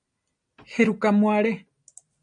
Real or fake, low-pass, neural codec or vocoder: real; 9.9 kHz; none